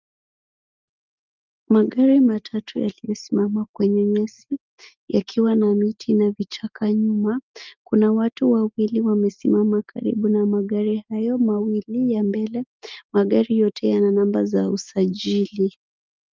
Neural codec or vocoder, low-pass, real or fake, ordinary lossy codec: none; 7.2 kHz; real; Opus, 24 kbps